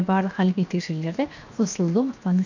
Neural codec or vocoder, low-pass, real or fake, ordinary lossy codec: codec, 16 kHz, 0.7 kbps, FocalCodec; 7.2 kHz; fake; none